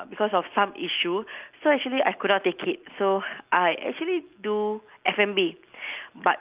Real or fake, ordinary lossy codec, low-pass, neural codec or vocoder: real; Opus, 24 kbps; 3.6 kHz; none